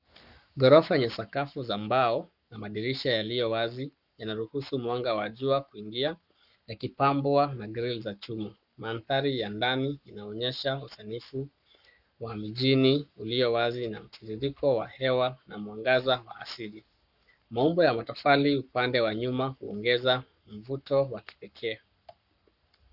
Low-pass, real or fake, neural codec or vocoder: 5.4 kHz; fake; codec, 44.1 kHz, 7.8 kbps, Pupu-Codec